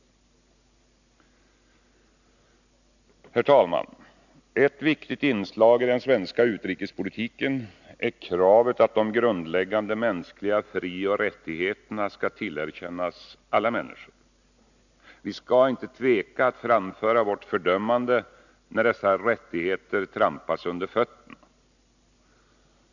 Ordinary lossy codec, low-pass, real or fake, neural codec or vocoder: none; 7.2 kHz; real; none